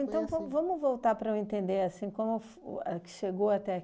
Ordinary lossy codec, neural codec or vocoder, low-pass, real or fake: none; none; none; real